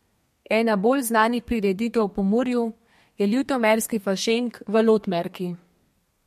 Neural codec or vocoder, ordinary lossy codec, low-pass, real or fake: codec, 32 kHz, 1.9 kbps, SNAC; MP3, 64 kbps; 14.4 kHz; fake